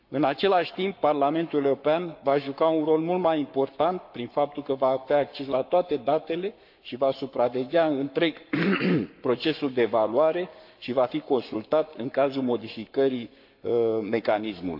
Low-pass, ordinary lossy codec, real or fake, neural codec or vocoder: 5.4 kHz; MP3, 48 kbps; fake; codec, 44.1 kHz, 7.8 kbps, Pupu-Codec